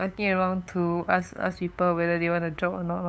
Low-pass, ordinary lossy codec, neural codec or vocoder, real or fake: none; none; codec, 16 kHz, 8 kbps, FunCodec, trained on LibriTTS, 25 frames a second; fake